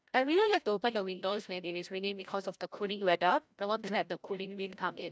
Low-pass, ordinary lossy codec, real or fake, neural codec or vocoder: none; none; fake; codec, 16 kHz, 0.5 kbps, FreqCodec, larger model